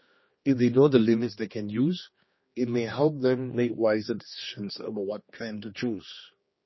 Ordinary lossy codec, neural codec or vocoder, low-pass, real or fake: MP3, 24 kbps; codec, 16 kHz, 2 kbps, X-Codec, HuBERT features, trained on general audio; 7.2 kHz; fake